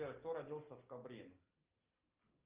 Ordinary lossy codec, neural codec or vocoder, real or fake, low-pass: Opus, 24 kbps; none; real; 3.6 kHz